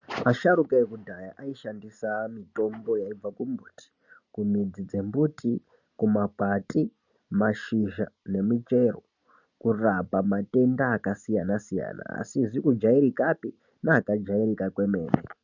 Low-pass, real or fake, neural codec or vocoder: 7.2 kHz; real; none